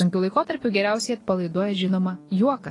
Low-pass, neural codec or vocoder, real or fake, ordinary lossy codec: 10.8 kHz; vocoder, 24 kHz, 100 mel bands, Vocos; fake; AAC, 32 kbps